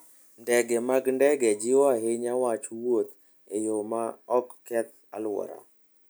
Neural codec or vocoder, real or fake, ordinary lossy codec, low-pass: none; real; none; none